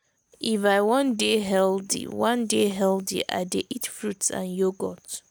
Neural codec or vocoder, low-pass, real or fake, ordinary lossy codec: none; none; real; none